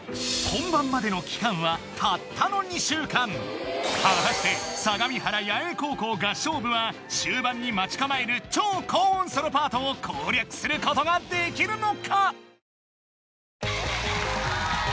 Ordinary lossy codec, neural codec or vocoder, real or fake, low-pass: none; none; real; none